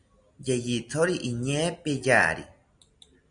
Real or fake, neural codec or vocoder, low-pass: real; none; 9.9 kHz